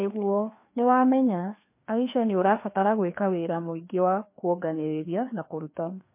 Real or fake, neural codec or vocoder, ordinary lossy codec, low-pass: fake; codec, 16 kHz, 2 kbps, FreqCodec, larger model; MP3, 24 kbps; 3.6 kHz